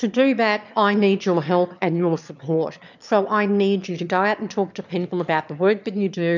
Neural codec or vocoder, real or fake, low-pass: autoencoder, 22.05 kHz, a latent of 192 numbers a frame, VITS, trained on one speaker; fake; 7.2 kHz